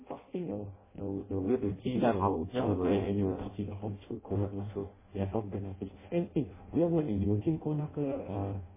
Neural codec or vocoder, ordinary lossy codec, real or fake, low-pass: codec, 16 kHz in and 24 kHz out, 0.6 kbps, FireRedTTS-2 codec; MP3, 16 kbps; fake; 3.6 kHz